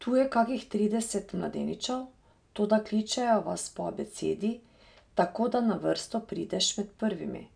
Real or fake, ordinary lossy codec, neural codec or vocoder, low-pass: real; none; none; 9.9 kHz